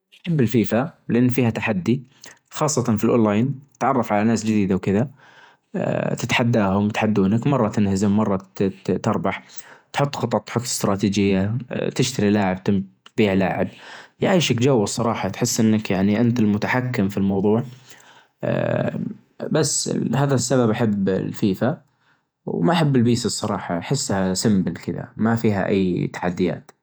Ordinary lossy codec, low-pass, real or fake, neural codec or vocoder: none; none; fake; vocoder, 48 kHz, 128 mel bands, Vocos